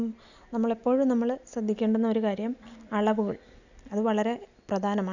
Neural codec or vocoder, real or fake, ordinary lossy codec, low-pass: none; real; none; 7.2 kHz